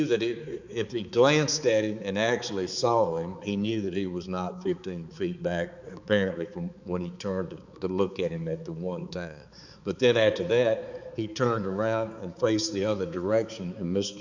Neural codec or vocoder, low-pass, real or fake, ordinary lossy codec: codec, 16 kHz, 4 kbps, X-Codec, HuBERT features, trained on balanced general audio; 7.2 kHz; fake; Opus, 64 kbps